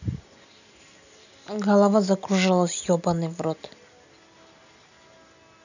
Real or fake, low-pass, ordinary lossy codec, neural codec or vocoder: real; 7.2 kHz; none; none